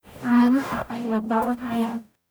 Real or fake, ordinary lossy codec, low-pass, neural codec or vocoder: fake; none; none; codec, 44.1 kHz, 0.9 kbps, DAC